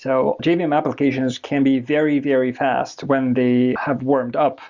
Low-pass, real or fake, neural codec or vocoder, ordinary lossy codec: 7.2 kHz; real; none; Opus, 64 kbps